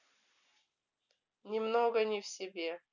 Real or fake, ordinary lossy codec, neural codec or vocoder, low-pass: real; none; none; 7.2 kHz